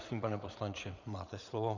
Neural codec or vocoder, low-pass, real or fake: vocoder, 44.1 kHz, 80 mel bands, Vocos; 7.2 kHz; fake